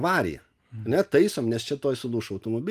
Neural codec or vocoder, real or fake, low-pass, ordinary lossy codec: none; real; 14.4 kHz; Opus, 32 kbps